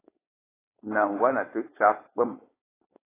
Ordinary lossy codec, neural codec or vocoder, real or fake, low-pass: AAC, 16 kbps; codec, 16 kHz, 4.8 kbps, FACodec; fake; 3.6 kHz